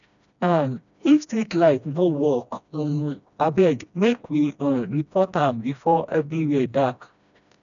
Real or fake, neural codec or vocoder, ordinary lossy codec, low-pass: fake; codec, 16 kHz, 1 kbps, FreqCodec, smaller model; none; 7.2 kHz